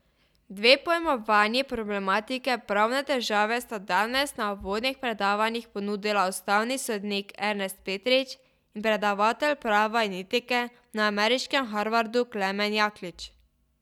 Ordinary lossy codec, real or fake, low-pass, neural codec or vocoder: none; real; 19.8 kHz; none